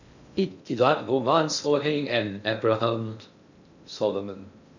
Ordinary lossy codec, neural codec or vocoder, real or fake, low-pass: none; codec, 16 kHz in and 24 kHz out, 0.6 kbps, FocalCodec, streaming, 2048 codes; fake; 7.2 kHz